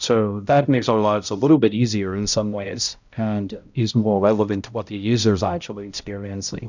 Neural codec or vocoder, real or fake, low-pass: codec, 16 kHz, 0.5 kbps, X-Codec, HuBERT features, trained on balanced general audio; fake; 7.2 kHz